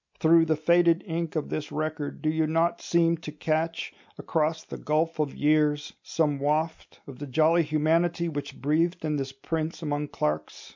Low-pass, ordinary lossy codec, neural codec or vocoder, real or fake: 7.2 kHz; MP3, 64 kbps; none; real